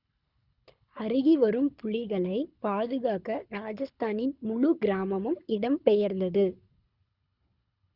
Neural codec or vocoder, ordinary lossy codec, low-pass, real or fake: codec, 24 kHz, 6 kbps, HILCodec; Opus, 64 kbps; 5.4 kHz; fake